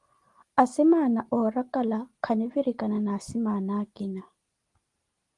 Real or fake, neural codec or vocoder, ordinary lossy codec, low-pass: real; none; Opus, 24 kbps; 10.8 kHz